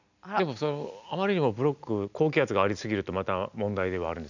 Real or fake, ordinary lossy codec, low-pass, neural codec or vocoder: real; none; 7.2 kHz; none